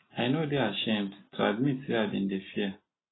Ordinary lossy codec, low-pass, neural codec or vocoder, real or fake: AAC, 16 kbps; 7.2 kHz; none; real